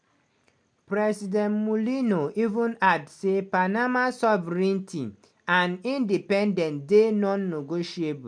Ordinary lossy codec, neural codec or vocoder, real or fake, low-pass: MP3, 64 kbps; none; real; 9.9 kHz